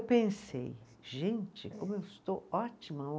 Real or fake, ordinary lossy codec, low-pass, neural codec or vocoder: real; none; none; none